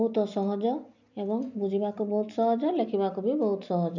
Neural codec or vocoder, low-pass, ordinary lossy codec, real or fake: none; 7.2 kHz; none; real